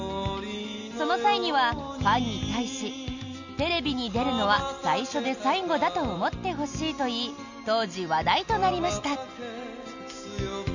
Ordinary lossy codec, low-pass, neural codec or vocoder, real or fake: none; 7.2 kHz; none; real